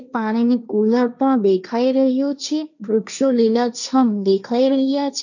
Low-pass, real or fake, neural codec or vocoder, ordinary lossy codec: 7.2 kHz; fake; codec, 16 kHz, 1.1 kbps, Voila-Tokenizer; none